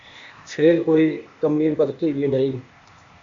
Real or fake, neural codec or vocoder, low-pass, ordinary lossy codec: fake; codec, 16 kHz, 0.8 kbps, ZipCodec; 7.2 kHz; AAC, 64 kbps